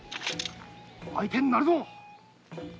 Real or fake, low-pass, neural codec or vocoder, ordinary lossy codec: real; none; none; none